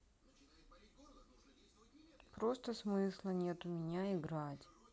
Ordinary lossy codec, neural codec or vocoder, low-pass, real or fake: none; none; none; real